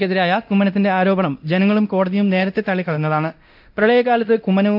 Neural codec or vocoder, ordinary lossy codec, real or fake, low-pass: codec, 24 kHz, 0.9 kbps, DualCodec; AAC, 48 kbps; fake; 5.4 kHz